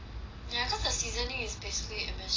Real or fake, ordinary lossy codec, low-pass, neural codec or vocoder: real; AAC, 32 kbps; 7.2 kHz; none